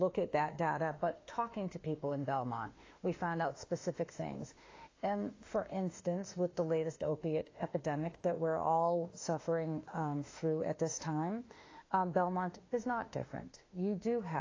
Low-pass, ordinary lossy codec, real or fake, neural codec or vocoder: 7.2 kHz; AAC, 32 kbps; fake; autoencoder, 48 kHz, 32 numbers a frame, DAC-VAE, trained on Japanese speech